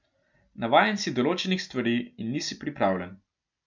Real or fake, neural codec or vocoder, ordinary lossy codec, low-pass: real; none; MP3, 64 kbps; 7.2 kHz